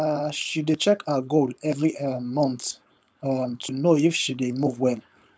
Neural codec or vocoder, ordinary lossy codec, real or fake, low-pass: codec, 16 kHz, 4.8 kbps, FACodec; none; fake; none